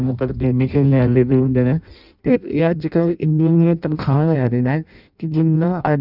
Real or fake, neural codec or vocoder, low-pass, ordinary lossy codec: fake; codec, 16 kHz in and 24 kHz out, 0.6 kbps, FireRedTTS-2 codec; 5.4 kHz; none